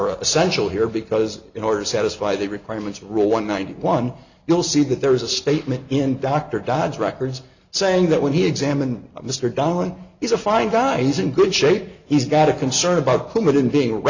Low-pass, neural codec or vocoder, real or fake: 7.2 kHz; none; real